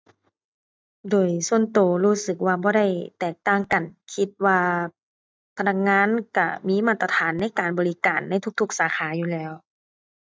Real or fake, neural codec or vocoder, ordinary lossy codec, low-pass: real; none; none; none